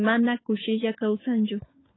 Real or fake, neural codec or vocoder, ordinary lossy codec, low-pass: fake; codec, 16 kHz, 4 kbps, FunCodec, trained on LibriTTS, 50 frames a second; AAC, 16 kbps; 7.2 kHz